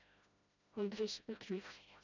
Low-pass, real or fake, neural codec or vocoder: 7.2 kHz; fake; codec, 16 kHz, 0.5 kbps, FreqCodec, smaller model